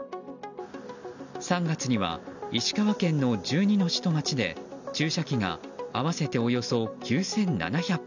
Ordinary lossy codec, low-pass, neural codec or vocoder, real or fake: none; 7.2 kHz; none; real